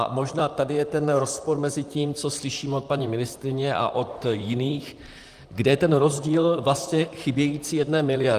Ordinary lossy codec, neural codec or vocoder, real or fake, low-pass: Opus, 32 kbps; vocoder, 44.1 kHz, 128 mel bands, Pupu-Vocoder; fake; 14.4 kHz